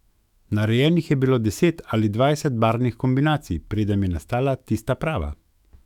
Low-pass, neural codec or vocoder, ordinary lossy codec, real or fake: 19.8 kHz; autoencoder, 48 kHz, 128 numbers a frame, DAC-VAE, trained on Japanese speech; none; fake